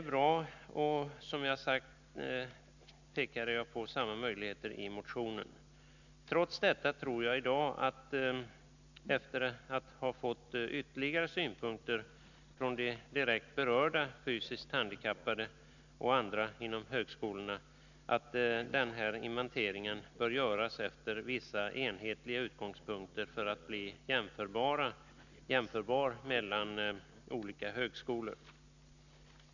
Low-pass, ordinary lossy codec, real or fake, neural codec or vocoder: 7.2 kHz; none; real; none